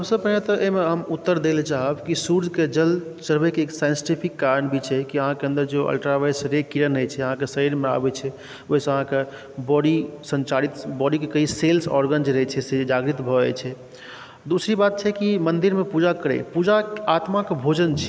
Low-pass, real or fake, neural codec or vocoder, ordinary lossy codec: none; real; none; none